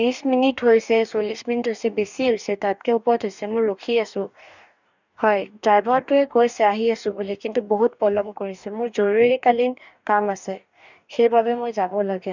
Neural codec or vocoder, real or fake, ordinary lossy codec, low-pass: codec, 44.1 kHz, 2.6 kbps, DAC; fake; none; 7.2 kHz